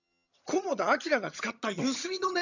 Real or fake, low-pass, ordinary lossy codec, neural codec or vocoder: fake; 7.2 kHz; none; vocoder, 22.05 kHz, 80 mel bands, HiFi-GAN